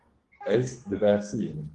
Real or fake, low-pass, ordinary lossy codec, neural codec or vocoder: fake; 10.8 kHz; Opus, 16 kbps; codec, 44.1 kHz, 2.6 kbps, SNAC